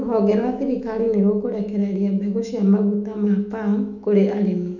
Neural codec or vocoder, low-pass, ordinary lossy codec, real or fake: autoencoder, 48 kHz, 128 numbers a frame, DAC-VAE, trained on Japanese speech; 7.2 kHz; none; fake